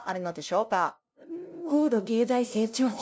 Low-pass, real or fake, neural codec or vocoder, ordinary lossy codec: none; fake; codec, 16 kHz, 0.5 kbps, FunCodec, trained on LibriTTS, 25 frames a second; none